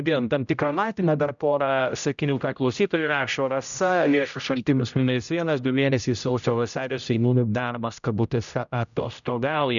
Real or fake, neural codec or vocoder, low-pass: fake; codec, 16 kHz, 0.5 kbps, X-Codec, HuBERT features, trained on general audio; 7.2 kHz